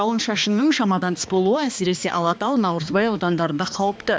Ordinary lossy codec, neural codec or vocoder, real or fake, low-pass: none; codec, 16 kHz, 2 kbps, X-Codec, HuBERT features, trained on balanced general audio; fake; none